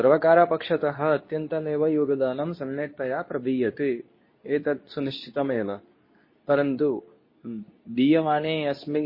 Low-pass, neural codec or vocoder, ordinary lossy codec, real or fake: 5.4 kHz; codec, 24 kHz, 0.9 kbps, WavTokenizer, medium speech release version 2; MP3, 24 kbps; fake